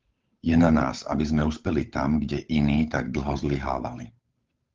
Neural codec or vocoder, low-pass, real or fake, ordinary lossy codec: codec, 16 kHz, 8 kbps, FunCodec, trained on Chinese and English, 25 frames a second; 7.2 kHz; fake; Opus, 32 kbps